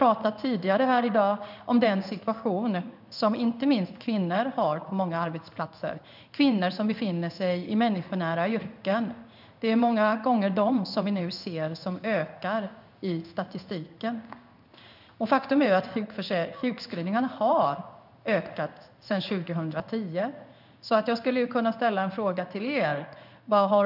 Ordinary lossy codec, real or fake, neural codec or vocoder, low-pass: none; fake; codec, 16 kHz in and 24 kHz out, 1 kbps, XY-Tokenizer; 5.4 kHz